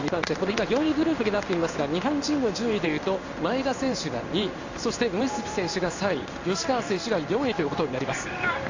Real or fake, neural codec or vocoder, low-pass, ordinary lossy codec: fake; codec, 16 kHz in and 24 kHz out, 1 kbps, XY-Tokenizer; 7.2 kHz; AAC, 48 kbps